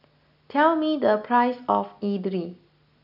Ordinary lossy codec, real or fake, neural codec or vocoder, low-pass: none; real; none; 5.4 kHz